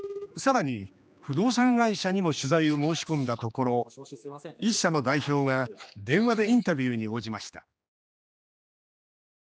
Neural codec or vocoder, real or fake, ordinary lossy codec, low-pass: codec, 16 kHz, 2 kbps, X-Codec, HuBERT features, trained on general audio; fake; none; none